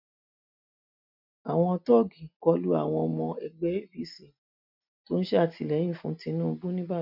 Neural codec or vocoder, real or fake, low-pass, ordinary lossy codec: none; real; 5.4 kHz; none